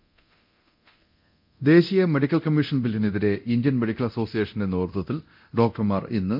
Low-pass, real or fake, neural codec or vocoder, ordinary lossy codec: 5.4 kHz; fake; codec, 24 kHz, 0.9 kbps, DualCodec; MP3, 48 kbps